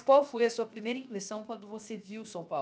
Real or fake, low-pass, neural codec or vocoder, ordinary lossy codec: fake; none; codec, 16 kHz, 0.7 kbps, FocalCodec; none